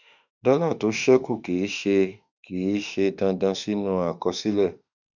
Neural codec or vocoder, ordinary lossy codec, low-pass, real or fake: autoencoder, 48 kHz, 32 numbers a frame, DAC-VAE, trained on Japanese speech; none; 7.2 kHz; fake